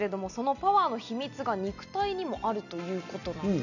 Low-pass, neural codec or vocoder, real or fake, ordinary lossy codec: 7.2 kHz; none; real; none